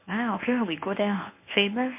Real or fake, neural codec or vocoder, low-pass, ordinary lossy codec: fake; codec, 24 kHz, 0.9 kbps, WavTokenizer, medium speech release version 1; 3.6 kHz; MP3, 24 kbps